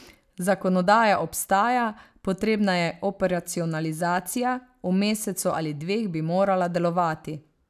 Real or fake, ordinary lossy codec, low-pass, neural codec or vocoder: real; none; 14.4 kHz; none